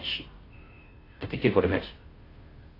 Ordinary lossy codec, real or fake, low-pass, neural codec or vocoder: AAC, 24 kbps; fake; 5.4 kHz; codec, 16 kHz, 0.5 kbps, FunCodec, trained on Chinese and English, 25 frames a second